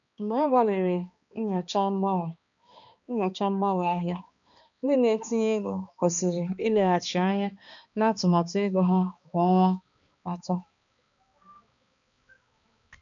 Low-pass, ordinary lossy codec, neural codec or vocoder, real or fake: 7.2 kHz; MP3, 96 kbps; codec, 16 kHz, 2 kbps, X-Codec, HuBERT features, trained on balanced general audio; fake